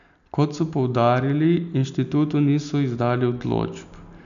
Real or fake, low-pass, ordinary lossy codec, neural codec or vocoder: real; 7.2 kHz; none; none